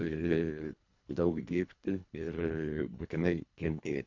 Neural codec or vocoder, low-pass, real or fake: codec, 24 kHz, 1.5 kbps, HILCodec; 7.2 kHz; fake